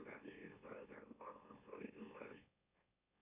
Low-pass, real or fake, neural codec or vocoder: 3.6 kHz; fake; autoencoder, 44.1 kHz, a latent of 192 numbers a frame, MeloTTS